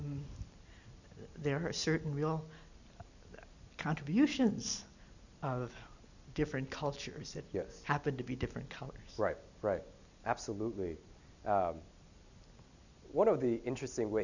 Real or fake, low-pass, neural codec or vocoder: real; 7.2 kHz; none